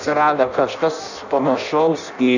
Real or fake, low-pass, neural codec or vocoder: fake; 7.2 kHz; codec, 16 kHz in and 24 kHz out, 0.6 kbps, FireRedTTS-2 codec